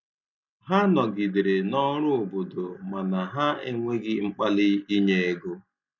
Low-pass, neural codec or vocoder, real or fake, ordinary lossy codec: 7.2 kHz; none; real; none